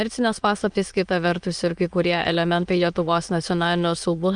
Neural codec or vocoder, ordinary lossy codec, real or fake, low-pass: autoencoder, 22.05 kHz, a latent of 192 numbers a frame, VITS, trained on many speakers; Opus, 32 kbps; fake; 9.9 kHz